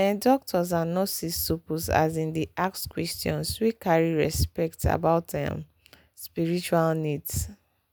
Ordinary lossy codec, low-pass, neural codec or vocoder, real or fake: none; none; none; real